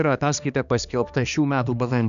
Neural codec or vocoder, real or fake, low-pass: codec, 16 kHz, 2 kbps, X-Codec, HuBERT features, trained on balanced general audio; fake; 7.2 kHz